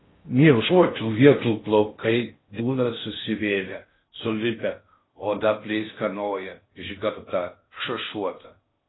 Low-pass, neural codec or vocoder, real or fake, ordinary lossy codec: 7.2 kHz; codec, 16 kHz in and 24 kHz out, 0.6 kbps, FocalCodec, streaming, 2048 codes; fake; AAC, 16 kbps